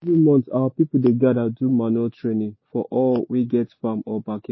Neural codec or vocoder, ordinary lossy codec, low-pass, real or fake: none; MP3, 24 kbps; 7.2 kHz; real